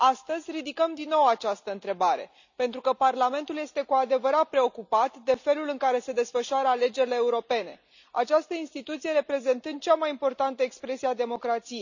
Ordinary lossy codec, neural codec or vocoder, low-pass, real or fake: none; none; 7.2 kHz; real